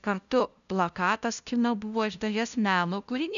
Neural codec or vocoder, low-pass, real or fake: codec, 16 kHz, 0.5 kbps, FunCodec, trained on LibriTTS, 25 frames a second; 7.2 kHz; fake